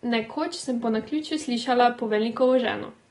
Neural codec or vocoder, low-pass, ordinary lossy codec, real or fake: none; 10.8 kHz; AAC, 32 kbps; real